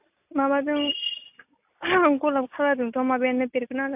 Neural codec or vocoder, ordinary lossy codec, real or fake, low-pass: none; AAC, 32 kbps; real; 3.6 kHz